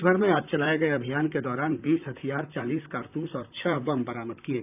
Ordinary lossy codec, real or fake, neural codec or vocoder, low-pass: none; fake; vocoder, 44.1 kHz, 128 mel bands, Pupu-Vocoder; 3.6 kHz